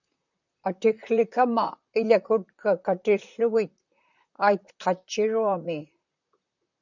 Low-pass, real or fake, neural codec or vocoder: 7.2 kHz; fake; vocoder, 44.1 kHz, 128 mel bands, Pupu-Vocoder